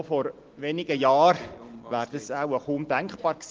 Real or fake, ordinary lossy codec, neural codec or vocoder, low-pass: real; Opus, 16 kbps; none; 7.2 kHz